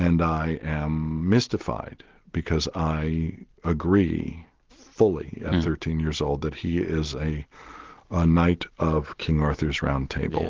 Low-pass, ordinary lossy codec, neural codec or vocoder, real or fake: 7.2 kHz; Opus, 16 kbps; none; real